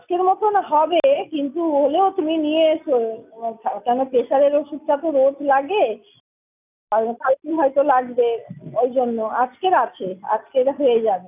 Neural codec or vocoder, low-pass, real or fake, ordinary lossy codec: none; 3.6 kHz; real; none